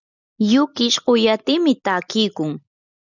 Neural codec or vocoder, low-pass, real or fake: none; 7.2 kHz; real